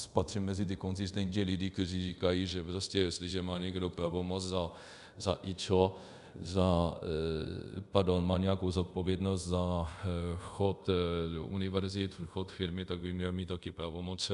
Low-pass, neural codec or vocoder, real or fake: 10.8 kHz; codec, 24 kHz, 0.5 kbps, DualCodec; fake